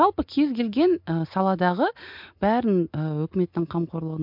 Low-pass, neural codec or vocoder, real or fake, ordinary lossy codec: 5.4 kHz; none; real; MP3, 48 kbps